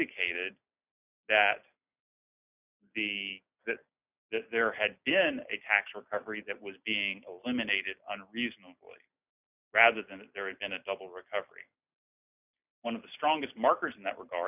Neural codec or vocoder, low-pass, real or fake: none; 3.6 kHz; real